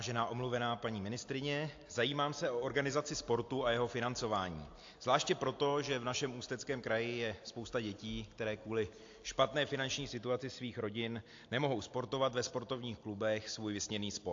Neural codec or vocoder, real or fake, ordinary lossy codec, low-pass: none; real; AAC, 48 kbps; 7.2 kHz